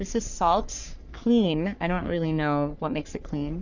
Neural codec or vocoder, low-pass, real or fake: codec, 44.1 kHz, 3.4 kbps, Pupu-Codec; 7.2 kHz; fake